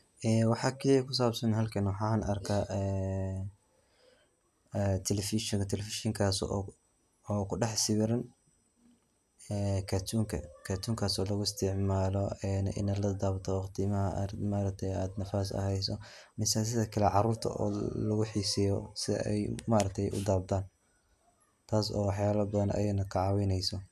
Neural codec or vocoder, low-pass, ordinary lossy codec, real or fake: none; 14.4 kHz; none; real